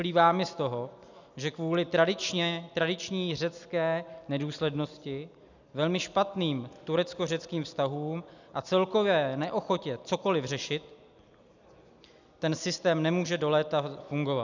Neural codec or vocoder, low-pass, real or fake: none; 7.2 kHz; real